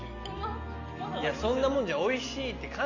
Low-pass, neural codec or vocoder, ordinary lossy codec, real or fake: 7.2 kHz; none; none; real